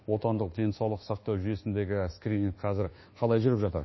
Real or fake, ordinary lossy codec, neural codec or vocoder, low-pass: fake; MP3, 24 kbps; codec, 24 kHz, 1.2 kbps, DualCodec; 7.2 kHz